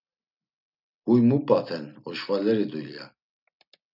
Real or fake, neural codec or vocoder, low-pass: real; none; 5.4 kHz